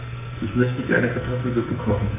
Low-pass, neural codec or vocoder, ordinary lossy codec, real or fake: 3.6 kHz; codec, 44.1 kHz, 2.6 kbps, SNAC; none; fake